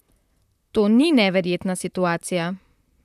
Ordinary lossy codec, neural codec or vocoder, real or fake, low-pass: none; vocoder, 44.1 kHz, 128 mel bands, Pupu-Vocoder; fake; 14.4 kHz